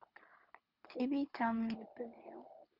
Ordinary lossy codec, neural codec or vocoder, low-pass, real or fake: Opus, 64 kbps; codec, 24 kHz, 6 kbps, HILCodec; 5.4 kHz; fake